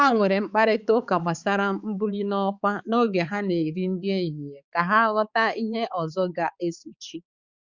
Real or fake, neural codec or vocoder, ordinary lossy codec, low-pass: fake; codec, 16 kHz, 4 kbps, X-Codec, HuBERT features, trained on balanced general audio; Opus, 64 kbps; 7.2 kHz